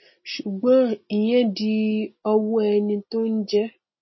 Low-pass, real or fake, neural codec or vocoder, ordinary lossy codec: 7.2 kHz; real; none; MP3, 24 kbps